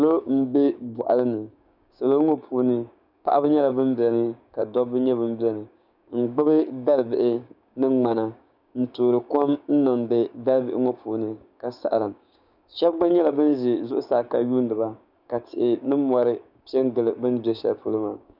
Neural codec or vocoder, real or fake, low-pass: codec, 16 kHz, 6 kbps, DAC; fake; 5.4 kHz